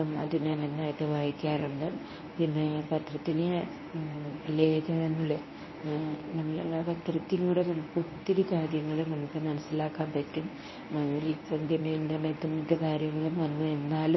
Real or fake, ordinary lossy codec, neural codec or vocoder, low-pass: fake; MP3, 24 kbps; codec, 24 kHz, 0.9 kbps, WavTokenizer, medium speech release version 1; 7.2 kHz